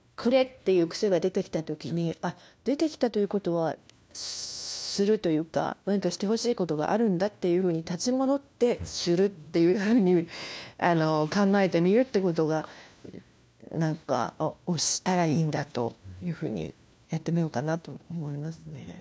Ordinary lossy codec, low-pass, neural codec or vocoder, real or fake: none; none; codec, 16 kHz, 1 kbps, FunCodec, trained on LibriTTS, 50 frames a second; fake